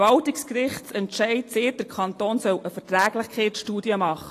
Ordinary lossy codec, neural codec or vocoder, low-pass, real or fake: AAC, 48 kbps; none; 14.4 kHz; real